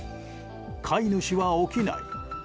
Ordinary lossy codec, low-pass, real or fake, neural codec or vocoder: none; none; real; none